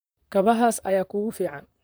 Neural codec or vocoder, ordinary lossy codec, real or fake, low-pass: vocoder, 44.1 kHz, 128 mel bands, Pupu-Vocoder; none; fake; none